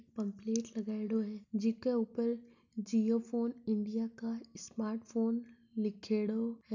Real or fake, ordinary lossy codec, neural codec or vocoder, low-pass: real; none; none; 7.2 kHz